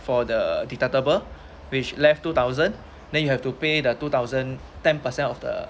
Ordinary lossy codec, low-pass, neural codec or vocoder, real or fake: none; none; none; real